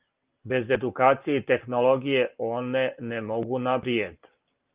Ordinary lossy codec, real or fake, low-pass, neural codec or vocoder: Opus, 32 kbps; real; 3.6 kHz; none